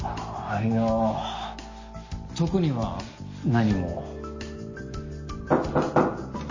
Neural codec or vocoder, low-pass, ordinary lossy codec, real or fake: none; 7.2 kHz; MP3, 32 kbps; real